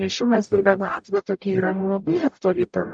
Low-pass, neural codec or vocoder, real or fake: 9.9 kHz; codec, 44.1 kHz, 0.9 kbps, DAC; fake